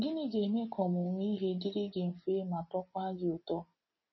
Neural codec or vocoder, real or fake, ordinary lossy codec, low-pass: none; real; MP3, 24 kbps; 7.2 kHz